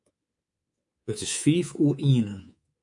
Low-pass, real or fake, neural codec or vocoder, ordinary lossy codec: 10.8 kHz; fake; codec, 24 kHz, 3.1 kbps, DualCodec; MP3, 64 kbps